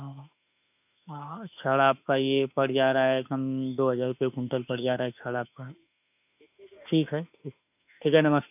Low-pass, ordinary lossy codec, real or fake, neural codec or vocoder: 3.6 kHz; none; fake; autoencoder, 48 kHz, 32 numbers a frame, DAC-VAE, trained on Japanese speech